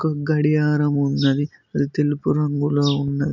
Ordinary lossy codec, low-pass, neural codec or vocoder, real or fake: none; 7.2 kHz; none; real